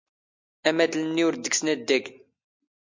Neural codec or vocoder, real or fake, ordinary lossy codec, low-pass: none; real; MP3, 48 kbps; 7.2 kHz